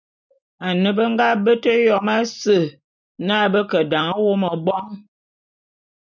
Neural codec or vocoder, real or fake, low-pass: none; real; 7.2 kHz